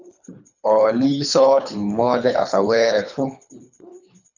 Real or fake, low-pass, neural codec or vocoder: fake; 7.2 kHz; codec, 24 kHz, 3 kbps, HILCodec